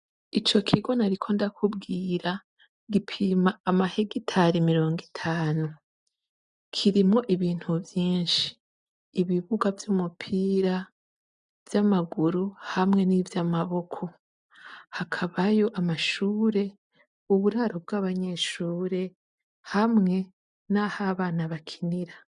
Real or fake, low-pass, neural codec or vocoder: real; 9.9 kHz; none